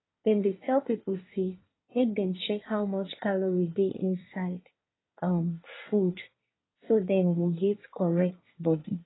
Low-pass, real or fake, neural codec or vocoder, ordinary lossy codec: 7.2 kHz; fake; codec, 24 kHz, 1 kbps, SNAC; AAC, 16 kbps